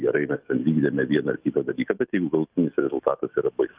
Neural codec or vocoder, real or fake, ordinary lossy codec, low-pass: autoencoder, 48 kHz, 128 numbers a frame, DAC-VAE, trained on Japanese speech; fake; Opus, 32 kbps; 3.6 kHz